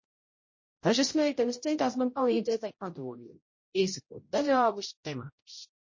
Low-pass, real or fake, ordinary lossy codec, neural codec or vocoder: 7.2 kHz; fake; MP3, 32 kbps; codec, 16 kHz, 0.5 kbps, X-Codec, HuBERT features, trained on general audio